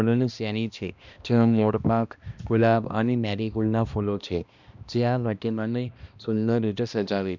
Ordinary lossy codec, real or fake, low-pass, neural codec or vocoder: none; fake; 7.2 kHz; codec, 16 kHz, 1 kbps, X-Codec, HuBERT features, trained on balanced general audio